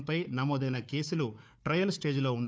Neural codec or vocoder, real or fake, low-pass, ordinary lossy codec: codec, 16 kHz, 4 kbps, FunCodec, trained on Chinese and English, 50 frames a second; fake; none; none